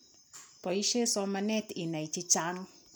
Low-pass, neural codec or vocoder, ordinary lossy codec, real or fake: none; none; none; real